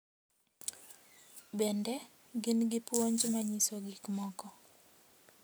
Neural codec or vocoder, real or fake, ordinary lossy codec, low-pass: none; real; none; none